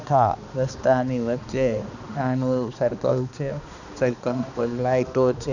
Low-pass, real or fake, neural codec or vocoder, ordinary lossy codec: 7.2 kHz; fake; codec, 16 kHz, 2 kbps, X-Codec, HuBERT features, trained on balanced general audio; none